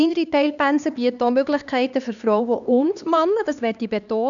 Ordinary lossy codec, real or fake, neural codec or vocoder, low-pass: none; fake; codec, 16 kHz, 2 kbps, X-Codec, HuBERT features, trained on LibriSpeech; 7.2 kHz